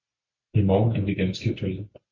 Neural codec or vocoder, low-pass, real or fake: none; 7.2 kHz; real